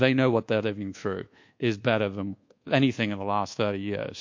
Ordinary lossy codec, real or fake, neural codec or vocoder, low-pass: MP3, 48 kbps; fake; codec, 24 kHz, 1.2 kbps, DualCodec; 7.2 kHz